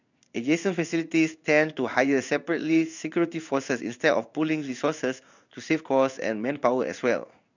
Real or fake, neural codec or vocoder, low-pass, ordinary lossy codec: fake; codec, 16 kHz in and 24 kHz out, 1 kbps, XY-Tokenizer; 7.2 kHz; none